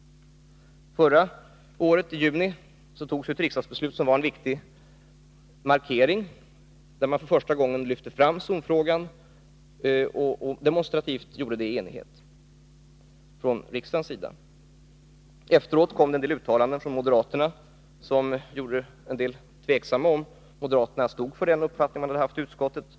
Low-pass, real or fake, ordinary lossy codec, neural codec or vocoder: none; real; none; none